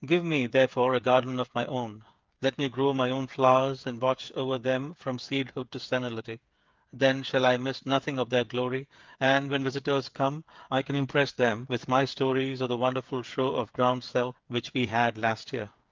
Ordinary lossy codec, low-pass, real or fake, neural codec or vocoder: Opus, 24 kbps; 7.2 kHz; fake; codec, 16 kHz, 8 kbps, FreqCodec, smaller model